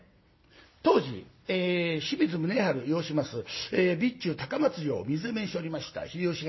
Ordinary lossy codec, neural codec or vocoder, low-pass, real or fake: MP3, 24 kbps; none; 7.2 kHz; real